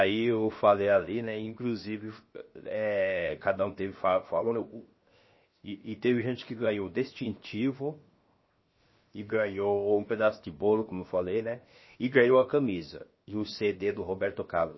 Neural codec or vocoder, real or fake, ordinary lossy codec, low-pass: codec, 16 kHz, 0.7 kbps, FocalCodec; fake; MP3, 24 kbps; 7.2 kHz